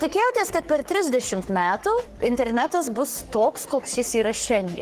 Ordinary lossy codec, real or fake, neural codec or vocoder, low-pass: Opus, 16 kbps; fake; codec, 44.1 kHz, 3.4 kbps, Pupu-Codec; 14.4 kHz